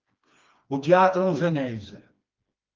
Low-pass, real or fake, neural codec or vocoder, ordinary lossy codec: 7.2 kHz; fake; codec, 16 kHz, 2 kbps, FreqCodec, smaller model; Opus, 32 kbps